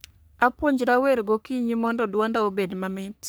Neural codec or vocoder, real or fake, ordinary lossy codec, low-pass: codec, 44.1 kHz, 3.4 kbps, Pupu-Codec; fake; none; none